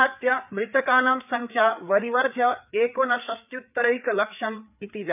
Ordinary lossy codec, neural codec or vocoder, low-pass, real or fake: none; codec, 16 kHz, 4 kbps, FreqCodec, larger model; 3.6 kHz; fake